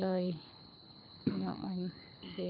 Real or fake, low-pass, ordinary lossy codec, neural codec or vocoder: fake; 5.4 kHz; none; codec, 24 kHz, 6 kbps, HILCodec